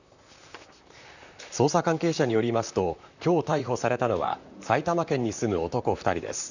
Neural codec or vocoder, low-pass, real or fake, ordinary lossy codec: vocoder, 44.1 kHz, 128 mel bands, Pupu-Vocoder; 7.2 kHz; fake; none